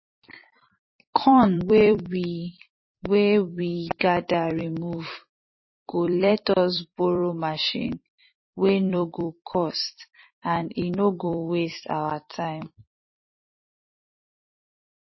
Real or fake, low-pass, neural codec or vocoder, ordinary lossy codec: fake; 7.2 kHz; vocoder, 44.1 kHz, 128 mel bands every 256 samples, BigVGAN v2; MP3, 24 kbps